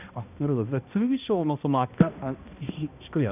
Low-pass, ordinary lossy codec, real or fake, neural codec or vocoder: 3.6 kHz; none; fake; codec, 16 kHz, 1 kbps, X-Codec, HuBERT features, trained on balanced general audio